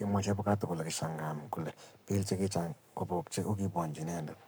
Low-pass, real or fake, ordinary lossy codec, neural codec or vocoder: none; fake; none; codec, 44.1 kHz, 7.8 kbps, Pupu-Codec